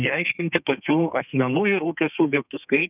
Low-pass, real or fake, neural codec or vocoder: 3.6 kHz; fake; codec, 32 kHz, 1.9 kbps, SNAC